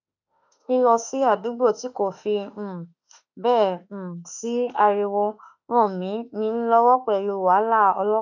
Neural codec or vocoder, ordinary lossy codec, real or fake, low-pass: autoencoder, 48 kHz, 32 numbers a frame, DAC-VAE, trained on Japanese speech; none; fake; 7.2 kHz